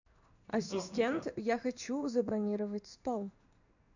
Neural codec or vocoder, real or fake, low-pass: codec, 16 kHz in and 24 kHz out, 1 kbps, XY-Tokenizer; fake; 7.2 kHz